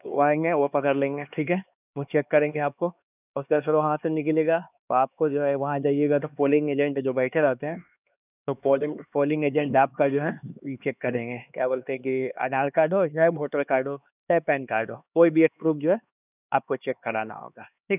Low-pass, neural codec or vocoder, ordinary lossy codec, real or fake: 3.6 kHz; codec, 16 kHz, 2 kbps, X-Codec, HuBERT features, trained on LibriSpeech; none; fake